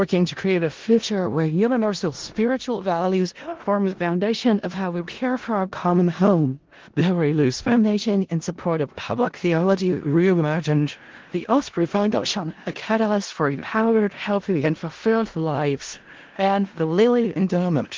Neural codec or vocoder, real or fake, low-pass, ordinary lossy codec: codec, 16 kHz in and 24 kHz out, 0.4 kbps, LongCat-Audio-Codec, four codebook decoder; fake; 7.2 kHz; Opus, 16 kbps